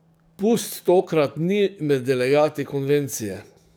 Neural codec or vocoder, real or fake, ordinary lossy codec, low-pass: codec, 44.1 kHz, 7.8 kbps, DAC; fake; none; none